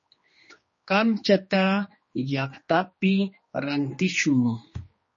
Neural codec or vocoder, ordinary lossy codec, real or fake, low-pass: codec, 16 kHz, 2 kbps, X-Codec, HuBERT features, trained on general audio; MP3, 32 kbps; fake; 7.2 kHz